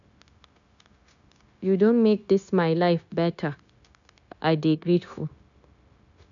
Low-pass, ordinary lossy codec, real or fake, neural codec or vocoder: 7.2 kHz; none; fake; codec, 16 kHz, 0.9 kbps, LongCat-Audio-Codec